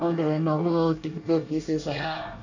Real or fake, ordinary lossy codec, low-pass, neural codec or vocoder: fake; none; 7.2 kHz; codec, 24 kHz, 1 kbps, SNAC